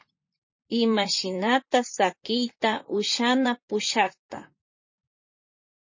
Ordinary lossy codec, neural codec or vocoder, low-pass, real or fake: MP3, 32 kbps; vocoder, 44.1 kHz, 128 mel bands, Pupu-Vocoder; 7.2 kHz; fake